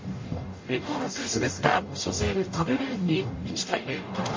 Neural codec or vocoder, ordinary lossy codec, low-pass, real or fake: codec, 44.1 kHz, 0.9 kbps, DAC; MP3, 32 kbps; 7.2 kHz; fake